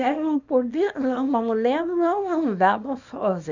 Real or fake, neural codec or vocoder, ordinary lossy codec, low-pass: fake; codec, 24 kHz, 0.9 kbps, WavTokenizer, small release; none; 7.2 kHz